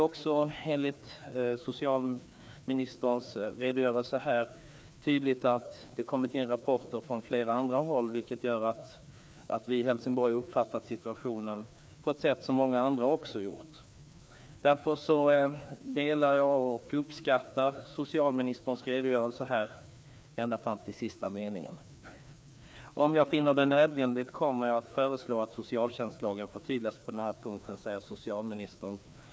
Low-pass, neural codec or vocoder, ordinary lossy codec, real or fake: none; codec, 16 kHz, 2 kbps, FreqCodec, larger model; none; fake